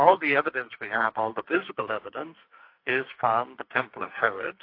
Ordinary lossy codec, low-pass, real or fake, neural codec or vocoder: AAC, 32 kbps; 5.4 kHz; fake; codec, 44.1 kHz, 2.6 kbps, SNAC